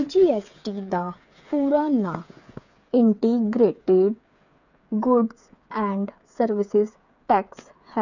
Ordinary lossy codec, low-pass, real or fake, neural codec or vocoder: none; 7.2 kHz; fake; codec, 16 kHz, 8 kbps, FreqCodec, smaller model